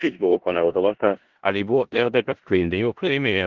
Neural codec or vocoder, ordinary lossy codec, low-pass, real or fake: codec, 16 kHz in and 24 kHz out, 0.4 kbps, LongCat-Audio-Codec, four codebook decoder; Opus, 16 kbps; 7.2 kHz; fake